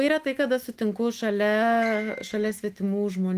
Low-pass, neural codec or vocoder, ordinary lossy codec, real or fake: 14.4 kHz; autoencoder, 48 kHz, 128 numbers a frame, DAC-VAE, trained on Japanese speech; Opus, 24 kbps; fake